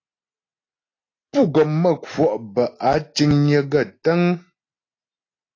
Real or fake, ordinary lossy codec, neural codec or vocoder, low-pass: real; AAC, 32 kbps; none; 7.2 kHz